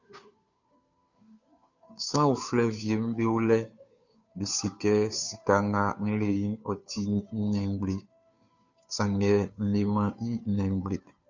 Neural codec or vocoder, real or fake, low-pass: codec, 16 kHz, 2 kbps, FunCodec, trained on Chinese and English, 25 frames a second; fake; 7.2 kHz